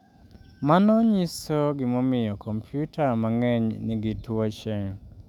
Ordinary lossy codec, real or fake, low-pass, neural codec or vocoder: none; fake; 19.8 kHz; autoencoder, 48 kHz, 128 numbers a frame, DAC-VAE, trained on Japanese speech